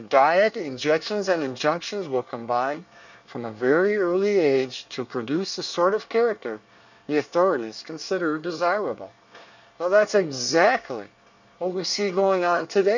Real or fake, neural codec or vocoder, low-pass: fake; codec, 24 kHz, 1 kbps, SNAC; 7.2 kHz